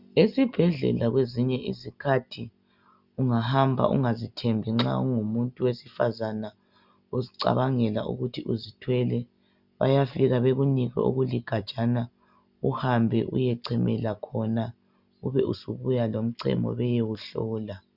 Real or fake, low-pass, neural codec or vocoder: real; 5.4 kHz; none